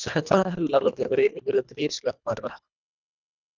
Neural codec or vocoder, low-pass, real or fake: codec, 24 kHz, 1.5 kbps, HILCodec; 7.2 kHz; fake